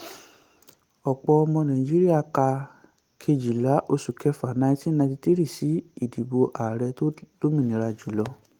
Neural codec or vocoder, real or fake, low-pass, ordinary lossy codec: none; real; 19.8 kHz; Opus, 24 kbps